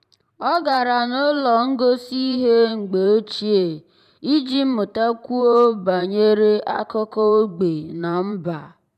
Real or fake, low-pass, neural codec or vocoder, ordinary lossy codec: fake; 14.4 kHz; vocoder, 44.1 kHz, 128 mel bands every 512 samples, BigVGAN v2; none